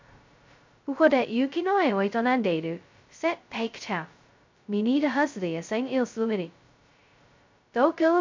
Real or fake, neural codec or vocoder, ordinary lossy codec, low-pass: fake; codec, 16 kHz, 0.2 kbps, FocalCodec; MP3, 64 kbps; 7.2 kHz